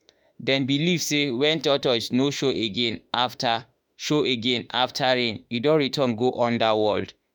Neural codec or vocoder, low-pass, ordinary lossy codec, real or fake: autoencoder, 48 kHz, 32 numbers a frame, DAC-VAE, trained on Japanese speech; none; none; fake